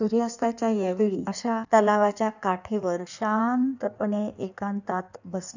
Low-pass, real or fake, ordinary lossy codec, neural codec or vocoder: 7.2 kHz; fake; none; codec, 16 kHz in and 24 kHz out, 1.1 kbps, FireRedTTS-2 codec